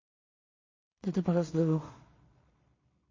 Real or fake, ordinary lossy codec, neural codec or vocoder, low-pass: fake; MP3, 32 kbps; codec, 16 kHz in and 24 kHz out, 0.4 kbps, LongCat-Audio-Codec, two codebook decoder; 7.2 kHz